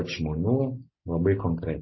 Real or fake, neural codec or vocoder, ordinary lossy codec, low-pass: real; none; MP3, 24 kbps; 7.2 kHz